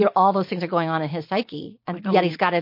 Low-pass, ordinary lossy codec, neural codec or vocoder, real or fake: 5.4 kHz; MP3, 32 kbps; none; real